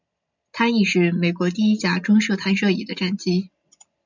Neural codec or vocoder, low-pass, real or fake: none; 7.2 kHz; real